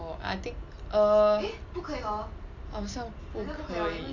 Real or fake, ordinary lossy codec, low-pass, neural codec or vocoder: real; none; 7.2 kHz; none